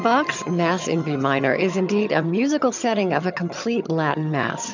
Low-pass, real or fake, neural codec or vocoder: 7.2 kHz; fake; vocoder, 22.05 kHz, 80 mel bands, HiFi-GAN